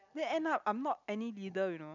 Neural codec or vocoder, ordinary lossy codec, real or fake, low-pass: none; none; real; 7.2 kHz